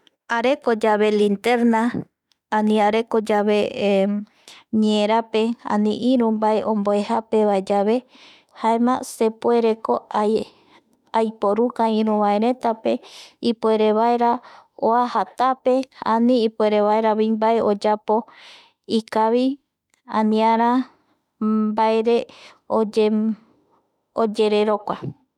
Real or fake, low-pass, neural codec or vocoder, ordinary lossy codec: fake; 19.8 kHz; autoencoder, 48 kHz, 32 numbers a frame, DAC-VAE, trained on Japanese speech; none